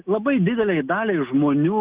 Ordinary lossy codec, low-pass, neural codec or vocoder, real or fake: Opus, 32 kbps; 3.6 kHz; none; real